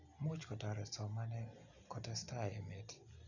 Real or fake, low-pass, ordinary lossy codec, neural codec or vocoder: real; 7.2 kHz; none; none